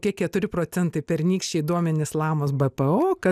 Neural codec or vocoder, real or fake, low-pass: none; real; 14.4 kHz